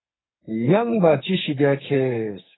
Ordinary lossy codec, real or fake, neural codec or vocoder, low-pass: AAC, 16 kbps; fake; codec, 44.1 kHz, 2.6 kbps, SNAC; 7.2 kHz